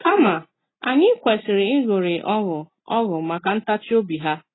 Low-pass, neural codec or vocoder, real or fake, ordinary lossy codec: 7.2 kHz; none; real; AAC, 16 kbps